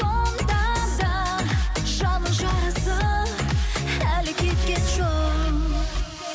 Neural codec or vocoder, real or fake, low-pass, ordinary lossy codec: none; real; none; none